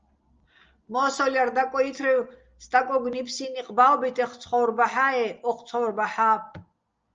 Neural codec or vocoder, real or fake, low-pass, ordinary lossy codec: none; real; 7.2 kHz; Opus, 24 kbps